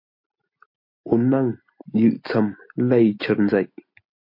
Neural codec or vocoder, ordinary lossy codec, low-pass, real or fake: none; MP3, 32 kbps; 5.4 kHz; real